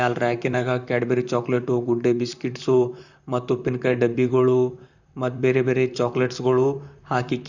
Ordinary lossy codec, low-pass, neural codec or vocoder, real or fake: none; 7.2 kHz; vocoder, 44.1 kHz, 128 mel bands, Pupu-Vocoder; fake